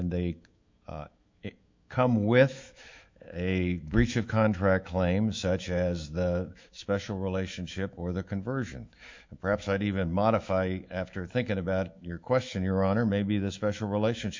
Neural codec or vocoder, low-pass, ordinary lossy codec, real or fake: autoencoder, 48 kHz, 128 numbers a frame, DAC-VAE, trained on Japanese speech; 7.2 kHz; MP3, 64 kbps; fake